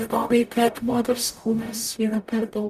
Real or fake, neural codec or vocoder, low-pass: fake; codec, 44.1 kHz, 0.9 kbps, DAC; 14.4 kHz